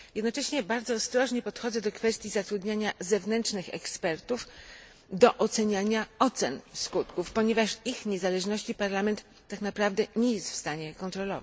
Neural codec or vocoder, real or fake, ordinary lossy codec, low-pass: none; real; none; none